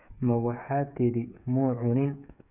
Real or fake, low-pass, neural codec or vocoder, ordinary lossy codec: fake; 3.6 kHz; codec, 16 kHz, 8 kbps, FreqCodec, smaller model; none